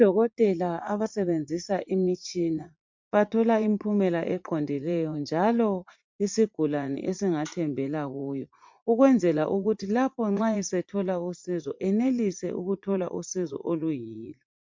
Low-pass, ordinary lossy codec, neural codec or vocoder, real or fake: 7.2 kHz; MP3, 48 kbps; vocoder, 44.1 kHz, 80 mel bands, Vocos; fake